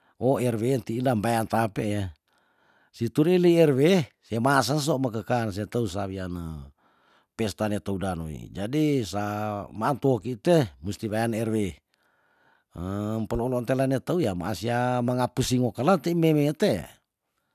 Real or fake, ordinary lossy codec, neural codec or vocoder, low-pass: real; none; none; 14.4 kHz